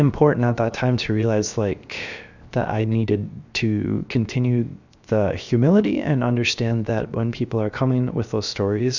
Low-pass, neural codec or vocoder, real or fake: 7.2 kHz; codec, 16 kHz, 0.7 kbps, FocalCodec; fake